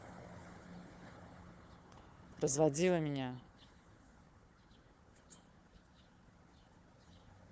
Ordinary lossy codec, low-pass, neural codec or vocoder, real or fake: none; none; codec, 16 kHz, 4 kbps, FunCodec, trained on Chinese and English, 50 frames a second; fake